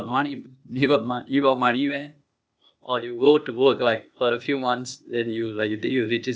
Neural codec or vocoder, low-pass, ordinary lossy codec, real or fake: codec, 16 kHz, 0.8 kbps, ZipCodec; none; none; fake